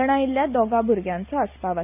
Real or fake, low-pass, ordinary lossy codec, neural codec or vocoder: real; 3.6 kHz; none; none